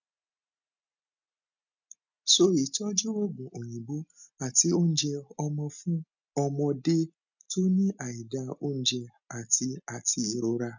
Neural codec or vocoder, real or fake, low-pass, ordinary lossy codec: none; real; 7.2 kHz; none